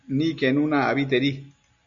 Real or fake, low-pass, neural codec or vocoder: real; 7.2 kHz; none